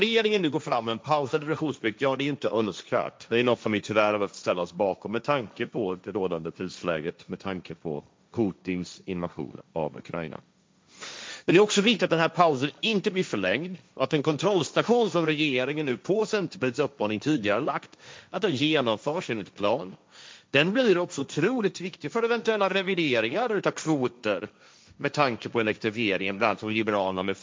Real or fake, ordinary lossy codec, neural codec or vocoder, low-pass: fake; none; codec, 16 kHz, 1.1 kbps, Voila-Tokenizer; none